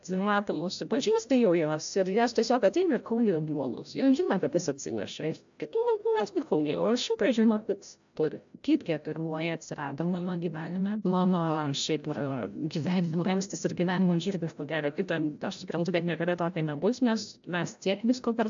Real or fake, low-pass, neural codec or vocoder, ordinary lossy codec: fake; 7.2 kHz; codec, 16 kHz, 0.5 kbps, FreqCodec, larger model; AAC, 64 kbps